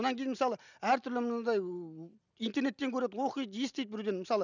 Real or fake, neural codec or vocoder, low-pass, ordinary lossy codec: real; none; 7.2 kHz; none